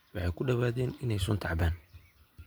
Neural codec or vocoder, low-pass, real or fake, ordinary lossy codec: none; none; real; none